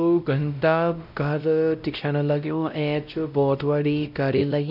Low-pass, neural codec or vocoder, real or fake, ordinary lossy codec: 5.4 kHz; codec, 16 kHz, 0.5 kbps, X-Codec, WavLM features, trained on Multilingual LibriSpeech; fake; none